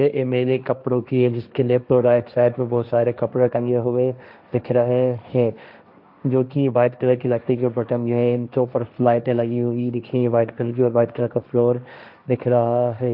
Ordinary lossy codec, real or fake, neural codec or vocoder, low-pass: none; fake; codec, 16 kHz, 1.1 kbps, Voila-Tokenizer; 5.4 kHz